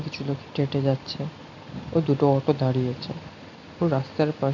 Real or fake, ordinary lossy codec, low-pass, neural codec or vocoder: real; none; 7.2 kHz; none